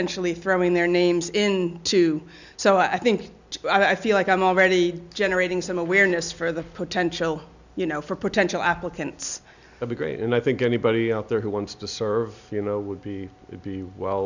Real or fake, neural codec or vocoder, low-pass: real; none; 7.2 kHz